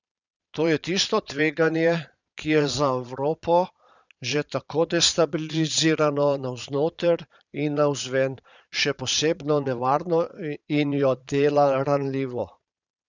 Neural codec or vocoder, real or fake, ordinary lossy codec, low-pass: vocoder, 22.05 kHz, 80 mel bands, Vocos; fake; none; 7.2 kHz